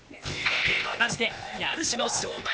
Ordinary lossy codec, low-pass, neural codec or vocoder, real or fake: none; none; codec, 16 kHz, 0.8 kbps, ZipCodec; fake